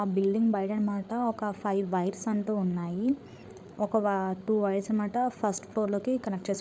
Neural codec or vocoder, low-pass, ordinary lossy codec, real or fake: codec, 16 kHz, 8 kbps, FreqCodec, larger model; none; none; fake